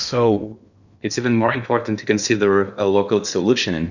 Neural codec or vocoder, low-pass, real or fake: codec, 16 kHz in and 24 kHz out, 0.8 kbps, FocalCodec, streaming, 65536 codes; 7.2 kHz; fake